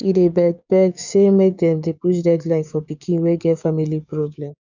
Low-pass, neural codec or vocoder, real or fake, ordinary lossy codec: 7.2 kHz; codec, 16 kHz, 6 kbps, DAC; fake; none